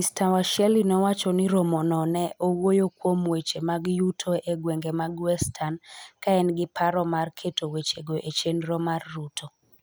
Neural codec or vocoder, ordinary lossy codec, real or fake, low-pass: none; none; real; none